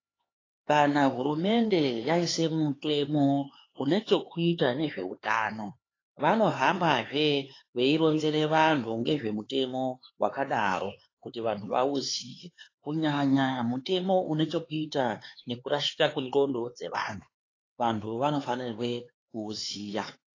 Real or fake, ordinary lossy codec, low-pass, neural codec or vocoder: fake; AAC, 32 kbps; 7.2 kHz; codec, 16 kHz, 4 kbps, X-Codec, HuBERT features, trained on LibriSpeech